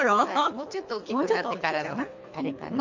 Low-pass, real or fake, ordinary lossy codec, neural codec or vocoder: 7.2 kHz; fake; MP3, 48 kbps; codec, 24 kHz, 3 kbps, HILCodec